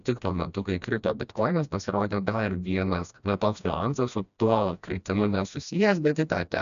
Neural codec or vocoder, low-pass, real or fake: codec, 16 kHz, 1 kbps, FreqCodec, smaller model; 7.2 kHz; fake